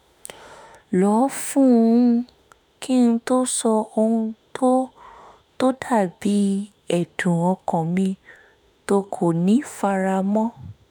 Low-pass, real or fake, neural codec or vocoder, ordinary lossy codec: none; fake; autoencoder, 48 kHz, 32 numbers a frame, DAC-VAE, trained on Japanese speech; none